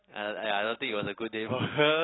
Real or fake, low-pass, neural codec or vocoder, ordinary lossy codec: real; 7.2 kHz; none; AAC, 16 kbps